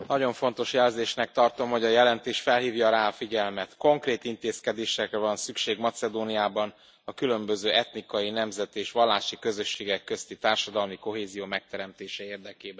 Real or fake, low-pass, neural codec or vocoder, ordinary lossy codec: real; none; none; none